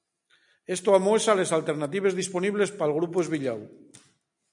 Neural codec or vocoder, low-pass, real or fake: none; 10.8 kHz; real